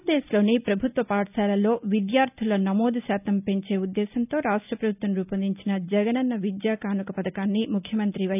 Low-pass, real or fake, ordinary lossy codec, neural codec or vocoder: 3.6 kHz; real; none; none